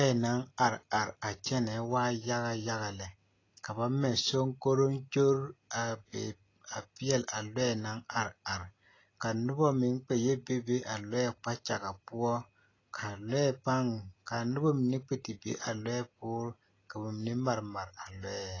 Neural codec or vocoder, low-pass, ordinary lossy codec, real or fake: none; 7.2 kHz; AAC, 32 kbps; real